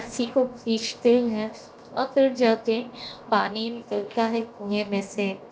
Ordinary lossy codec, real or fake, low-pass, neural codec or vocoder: none; fake; none; codec, 16 kHz, 0.7 kbps, FocalCodec